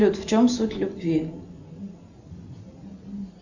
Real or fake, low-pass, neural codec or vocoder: real; 7.2 kHz; none